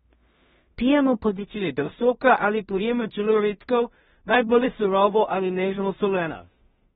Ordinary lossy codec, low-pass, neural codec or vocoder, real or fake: AAC, 16 kbps; 10.8 kHz; codec, 16 kHz in and 24 kHz out, 0.4 kbps, LongCat-Audio-Codec, two codebook decoder; fake